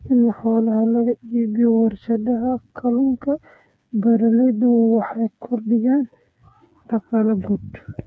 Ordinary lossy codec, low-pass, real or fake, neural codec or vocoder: none; none; fake; codec, 16 kHz, 4 kbps, FreqCodec, smaller model